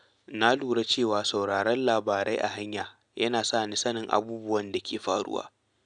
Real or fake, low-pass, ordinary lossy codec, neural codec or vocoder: real; 9.9 kHz; none; none